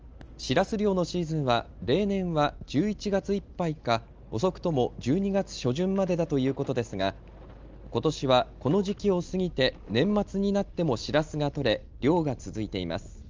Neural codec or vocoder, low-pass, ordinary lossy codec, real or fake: none; 7.2 kHz; Opus, 24 kbps; real